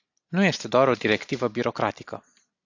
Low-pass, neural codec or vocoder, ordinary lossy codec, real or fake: 7.2 kHz; none; AAC, 48 kbps; real